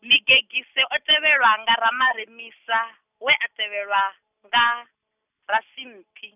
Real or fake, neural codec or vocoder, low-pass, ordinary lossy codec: real; none; 3.6 kHz; none